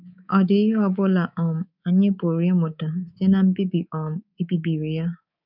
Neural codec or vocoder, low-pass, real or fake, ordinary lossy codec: codec, 24 kHz, 3.1 kbps, DualCodec; 5.4 kHz; fake; none